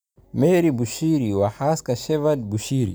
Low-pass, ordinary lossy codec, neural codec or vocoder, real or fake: none; none; none; real